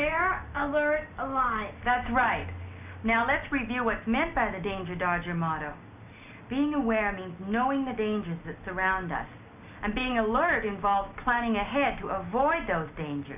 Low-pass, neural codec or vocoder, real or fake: 3.6 kHz; none; real